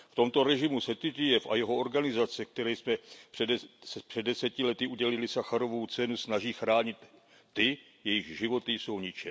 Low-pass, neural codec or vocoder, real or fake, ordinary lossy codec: none; none; real; none